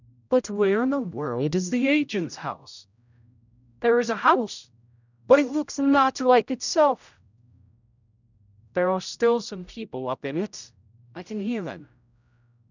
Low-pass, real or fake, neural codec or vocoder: 7.2 kHz; fake; codec, 16 kHz, 0.5 kbps, X-Codec, HuBERT features, trained on general audio